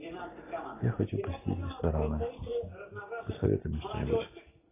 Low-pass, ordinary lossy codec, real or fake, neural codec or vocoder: 3.6 kHz; AAC, 16 kbps; real; none